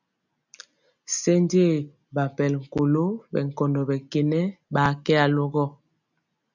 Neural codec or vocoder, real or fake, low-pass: none; real; 7.2 kHz